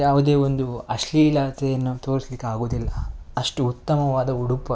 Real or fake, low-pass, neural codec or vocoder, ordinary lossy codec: real; none; none; none